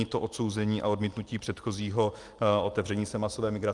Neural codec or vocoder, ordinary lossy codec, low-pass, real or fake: none; Opus, 32 kbps; 10.8 kHz; real